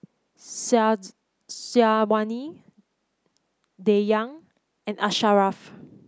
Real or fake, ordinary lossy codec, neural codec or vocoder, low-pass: real; none; none; none